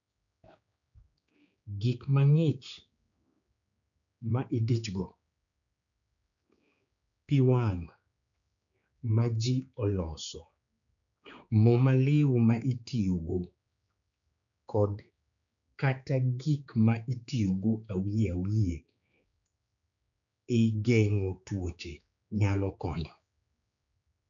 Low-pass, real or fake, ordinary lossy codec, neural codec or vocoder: 7.2 kHz; fake; none; codec, 16 kHz, 4 kbps, X-Codec, HuBERT features, trained on general audio